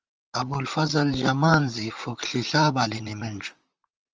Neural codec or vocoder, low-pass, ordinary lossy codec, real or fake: vocoder, 44.1 kHz, 128 mel bands, Pupu-Vocoder; 7.2 kHz; Opus, 24 kbps; fake